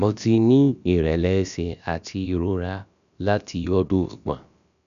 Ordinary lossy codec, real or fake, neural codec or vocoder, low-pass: none; fake; codec, 16 kHz, about 1 kbps, DyCAST, with the encoder's durations; 7.2 kHz